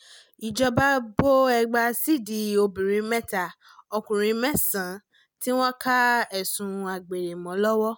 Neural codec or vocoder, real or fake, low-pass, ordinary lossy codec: none; real; none; none